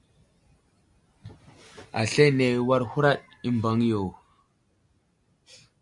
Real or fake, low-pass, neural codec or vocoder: real; 10.8 kHz; none